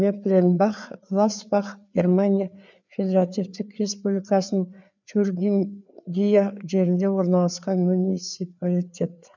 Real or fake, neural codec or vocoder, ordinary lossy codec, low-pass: fake; codec, 16 kHz, 4 kbps, FreqCodec, larger model; none; 7.2 kHz